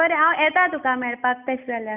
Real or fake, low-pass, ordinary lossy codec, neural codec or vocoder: real; 3.6 kHz; none; none